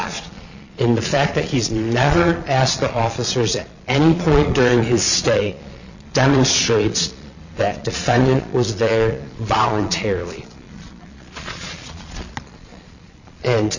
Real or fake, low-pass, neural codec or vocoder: fake; 7.2 kHz; vocoder, 22.05 kHz, 80 mel bands, Vocos